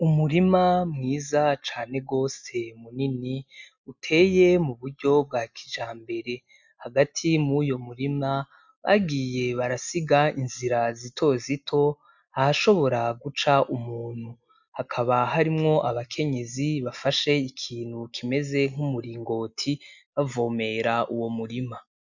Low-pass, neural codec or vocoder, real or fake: 7.2 kHz; none; real